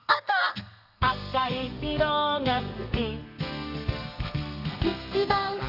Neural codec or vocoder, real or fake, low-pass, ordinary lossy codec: codec, 32 kHz, 1.9 kbps, SNAC; fake; 5.4 kHz; none